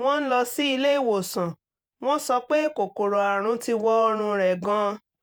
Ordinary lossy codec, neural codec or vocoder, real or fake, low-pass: none; vocoder, 48 kHz, 128 mel bands, Vocos; fake; none